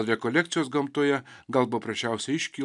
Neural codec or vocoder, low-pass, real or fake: none; 10.8 kHz; real